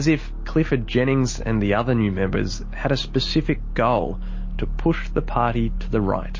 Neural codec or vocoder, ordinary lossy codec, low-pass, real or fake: none; MP3, 32 kbps; 7.2 kHz; real